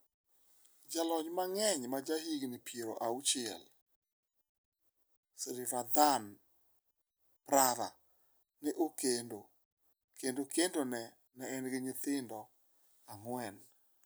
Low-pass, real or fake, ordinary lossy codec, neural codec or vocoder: none; real; none; none